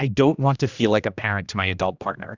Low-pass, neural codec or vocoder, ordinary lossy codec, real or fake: 7.2 kHz; codec, 16 kHz, 1 kbps, X-Codec, HuBERT features, trained on general audio; Opus, 64 kbps; fake